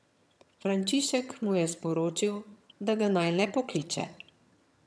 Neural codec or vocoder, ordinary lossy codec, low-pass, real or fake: vocoder, 22.05 kHz, 80 mel bands, HiFi-GAN; none; none; fake